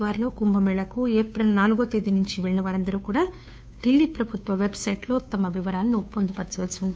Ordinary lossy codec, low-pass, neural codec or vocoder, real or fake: none; none; codec, 16 kHz, 2 kbps, FunCodec, trained on Chinese and English, 25 frames a second; fake